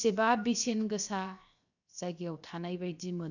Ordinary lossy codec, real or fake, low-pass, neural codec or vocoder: none; fake; 7.2 kHz; codec, 16 kHz, 0.7 kbps, FocalCodec